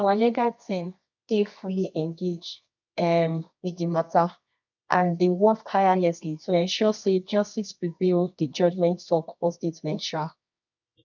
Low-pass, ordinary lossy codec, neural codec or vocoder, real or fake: 7.2 kHz; none; codec, 24 kHz, 0.9 kbps, WavTokenizer, medium music audio release; fake